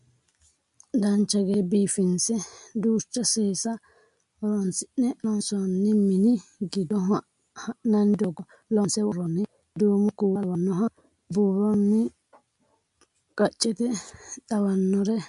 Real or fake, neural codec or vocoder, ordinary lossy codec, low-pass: real; none; MP3, 64 kbps; 10.8 kHz